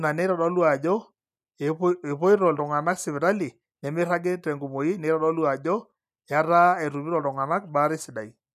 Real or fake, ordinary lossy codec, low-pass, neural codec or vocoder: real; none; 14.4 kHz; none